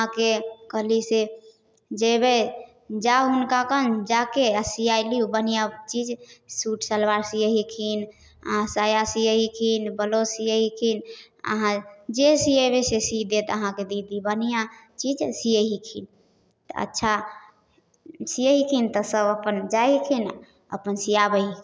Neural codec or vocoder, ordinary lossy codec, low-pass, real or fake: none; none; none; real